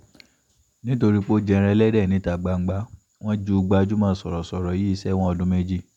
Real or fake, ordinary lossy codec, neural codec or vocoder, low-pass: real; none; none; 19.8 kHz